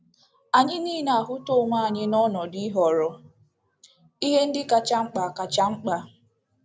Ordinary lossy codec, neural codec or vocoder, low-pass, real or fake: none; none; none; real